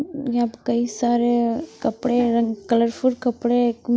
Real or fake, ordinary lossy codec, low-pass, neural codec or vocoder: real; none; none; none